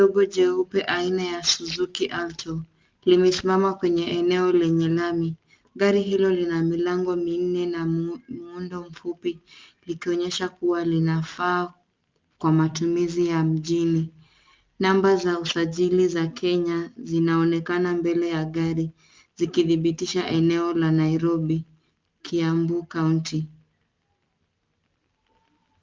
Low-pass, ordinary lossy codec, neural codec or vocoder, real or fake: 7.2 kHz; Opus, 24 kbps; none; real